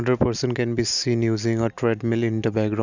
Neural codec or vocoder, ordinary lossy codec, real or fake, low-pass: none; none; real; 7.2 kHz